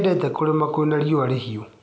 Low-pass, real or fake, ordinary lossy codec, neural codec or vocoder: none; real; none; none